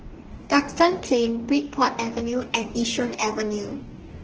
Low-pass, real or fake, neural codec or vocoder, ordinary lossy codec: 7.2 kHz; fake; codec, 44.1 kHz, 2.6 kbps, DAC; Opus, 16 kbps